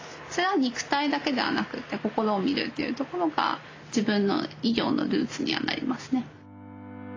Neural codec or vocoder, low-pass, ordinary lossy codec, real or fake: none; 7.2 kHz; AAC, 32 kbps; real